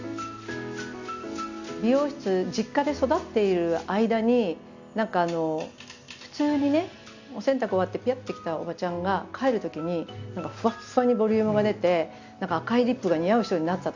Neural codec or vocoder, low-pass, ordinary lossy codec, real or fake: none; 7.2 kHz; Opus, 64 kbps; real